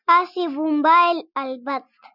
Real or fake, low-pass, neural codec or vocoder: real; 5.4 kHz; none